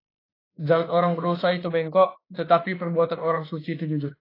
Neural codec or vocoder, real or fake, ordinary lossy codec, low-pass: autoencoder, 48 kHz, 32 numbers a frame, DAC-VAE, trained on Japanese speech; fake; AAC, 24 kbps; 5.4 kHz